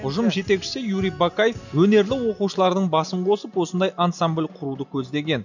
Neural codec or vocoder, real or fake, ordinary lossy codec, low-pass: none; real; none; 7.2 kHz